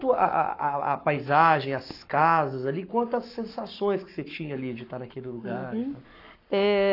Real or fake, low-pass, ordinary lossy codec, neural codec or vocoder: fake; 5.4 kHz; AAC, 32 kbps; codec, 44.1 kHz, 7.8 kbps, Pupu-Codec